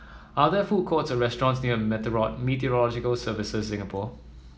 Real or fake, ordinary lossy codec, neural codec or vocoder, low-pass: real; none; none; none